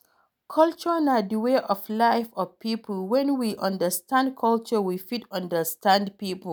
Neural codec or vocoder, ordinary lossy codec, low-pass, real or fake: none; none; none; real